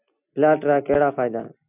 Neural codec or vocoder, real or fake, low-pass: none; real; 3.6 kHz